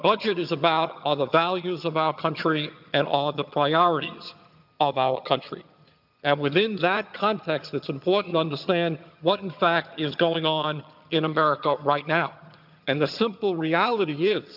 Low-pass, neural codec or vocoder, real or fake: 5.4 kHz; vocoder, 22.05 kHz, 80 mel bands, HiFi-GAN; fake